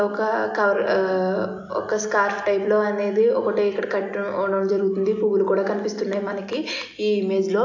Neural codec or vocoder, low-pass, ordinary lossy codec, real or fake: none; 7.2 kHz; AAC, 48 kbps; real